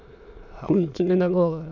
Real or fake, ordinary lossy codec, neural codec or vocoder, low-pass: fake; none; autoencoder, 22.05 kHz, a latent of 192 numbers a frame, VITS, trained on many speakers; 7.2 kHz